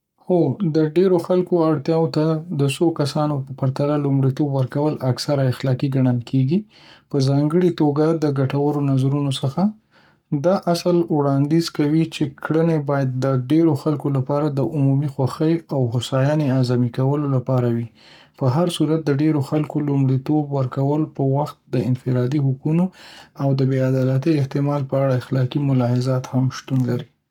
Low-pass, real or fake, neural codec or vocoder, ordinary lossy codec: 19.8 kHz; fake; codec, 44.1 kHz, 7.8 kbps, Pupu-Codec; none